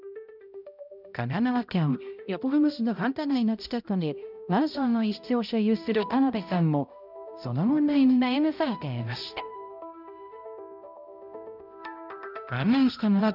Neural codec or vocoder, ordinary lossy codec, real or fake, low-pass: codec, 16 kHz, 0.5 kbps, X-Codec, HuBERT features, trained on balanced general audio; none; fake; 5.4 kHz